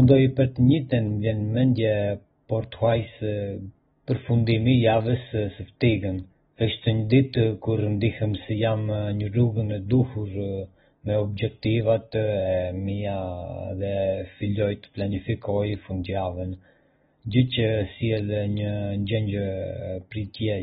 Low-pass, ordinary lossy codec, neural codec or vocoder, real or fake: 19.8 kHz; AAC, 16 kbps; none; real